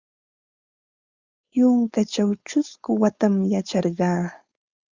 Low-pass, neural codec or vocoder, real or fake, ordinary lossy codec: 7.2 kHz; codec, 16 kHz, 4.8 kbps, FACodec; fake; Opus, 64 kbps